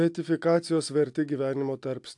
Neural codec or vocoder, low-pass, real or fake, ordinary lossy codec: none; 10.8 kHz; real; AAC, 64 kbps